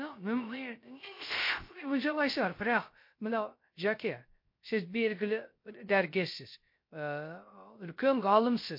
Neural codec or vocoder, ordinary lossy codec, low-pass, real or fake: codec, 16 kHz, 0.3 kbps, FocalCodec; MP3, 32 kbps; 5.4 kHz; fake